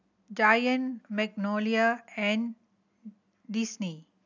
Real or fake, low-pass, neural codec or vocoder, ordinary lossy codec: real; 7.2 kHz; none; none